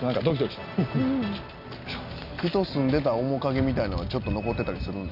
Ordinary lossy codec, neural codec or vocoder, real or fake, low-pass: none; none; real; 5.4 kHz